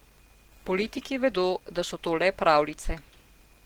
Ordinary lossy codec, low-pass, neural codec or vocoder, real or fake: Opus, 16 kbps; 19.8 kHz; none; real